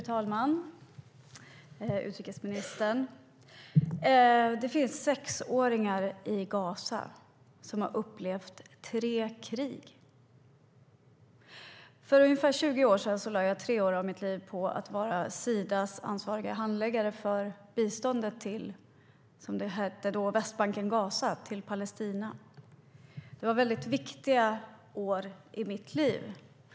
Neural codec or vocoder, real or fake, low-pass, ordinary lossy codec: none; real; none; none